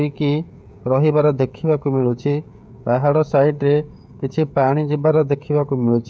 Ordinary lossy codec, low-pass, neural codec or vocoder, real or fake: none; none; codec, 16 kHz, 16 kbps, FreqCodec, smaller model; fake